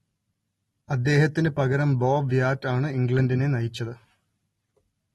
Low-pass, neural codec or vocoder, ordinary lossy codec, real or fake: 19.8 kHz; none; AAC, 32 kbps; real